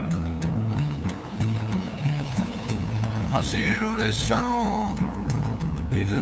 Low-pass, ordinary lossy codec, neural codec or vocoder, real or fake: none; none; codec, 16 kHz, 2 kbps, FunCodec, trained on LibriTTS, 25 frames a second; fake